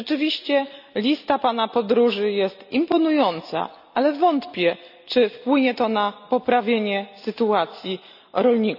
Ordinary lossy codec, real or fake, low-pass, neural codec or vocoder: none; real; 5.4 kHz; none